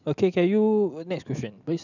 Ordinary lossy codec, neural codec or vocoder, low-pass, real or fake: none; none; 7.2 kHz; real